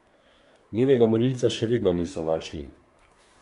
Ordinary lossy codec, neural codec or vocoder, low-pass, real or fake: none; codec, 24 kHz, 1 kbps, SNAC; 10.8 kHz; fake